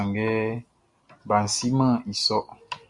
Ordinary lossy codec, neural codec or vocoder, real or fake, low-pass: Opus, 64 kbps; none; real; 10.8 kHz